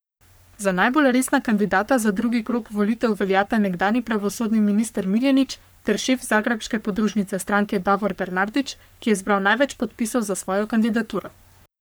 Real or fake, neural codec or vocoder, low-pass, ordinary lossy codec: fake; codec, 44.1 kHz, 3.4 kbps, Pupu-Codec; none; none